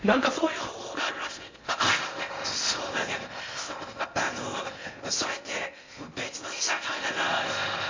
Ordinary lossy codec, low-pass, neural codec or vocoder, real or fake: MP3, 48 kbps; 7.2 kHz; codec, 16 kHz in and 24 kHz out, 0.6 kbps, FocalCodec, streaming, 4096 codes; fake